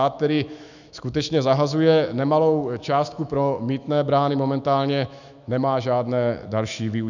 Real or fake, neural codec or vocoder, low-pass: fake; autoencoder, 48 kHz, 128 numbers a frame, DAC-VAE, trained on Japanese speech; 7.2 kHz